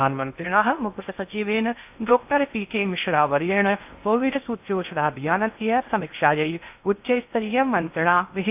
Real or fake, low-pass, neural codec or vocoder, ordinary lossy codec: fake; 3.6 kHz; codec, 16 kHz in and 24 kHz out, 0.6 kbps, FocalCodec, streaming, 2048 codes; AAC, 32 kbps